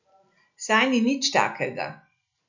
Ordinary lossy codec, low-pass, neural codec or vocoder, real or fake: none; 7.2 kHz; none; real